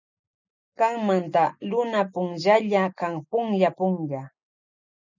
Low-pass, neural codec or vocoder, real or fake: 7.2 kHz; none; real